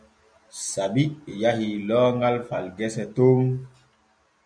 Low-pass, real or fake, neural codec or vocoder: 9.9 kHz; real; none